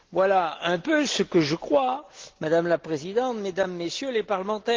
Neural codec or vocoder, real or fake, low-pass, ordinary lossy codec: none; real; 7.2 kHz; Opus, 16 kbps